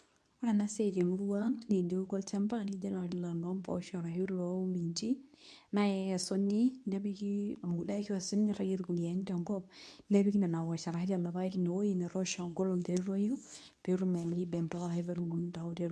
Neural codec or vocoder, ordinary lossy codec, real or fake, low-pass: codec, 24 kHz, 0.9 kbps, WavTokenizer, medium speech release version 2; none; fake; none